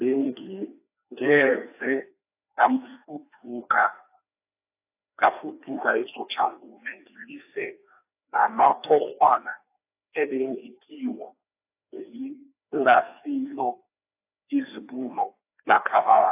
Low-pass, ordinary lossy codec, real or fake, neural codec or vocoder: 3.6 kHz; AAC, 24 kbps; fake; codec, 16 kHz, 2 kbps, FreqCodec, larger model